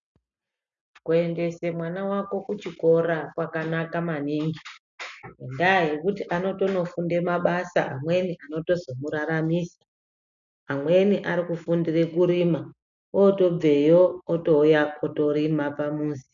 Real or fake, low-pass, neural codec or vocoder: real; 7.2 kHz; none